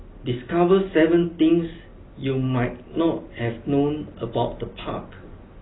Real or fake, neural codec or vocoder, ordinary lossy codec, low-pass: real; none; AAC, 16 kbps; 7.2 kHz